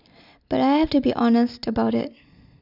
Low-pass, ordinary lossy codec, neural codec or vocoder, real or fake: 5.4 kHz; none; none; real